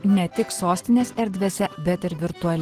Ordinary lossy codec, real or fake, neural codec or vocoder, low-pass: Opus, 16 kbps; real; none; 14.4 kHz